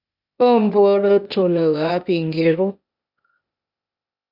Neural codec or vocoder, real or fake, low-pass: codec, 16 kHz, 0.8 kbps, ZipCodec; fake; 5.4 kHz